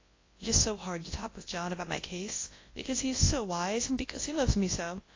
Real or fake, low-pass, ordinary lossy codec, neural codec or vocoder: fake; 7.2 kHz; AAC, 32 kbps; codec, 24 kHz, 0.9 kbps, WavTokenizer, large speech release